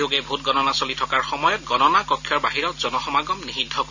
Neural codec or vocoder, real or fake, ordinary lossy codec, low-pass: none; real; none; 7.2 kHz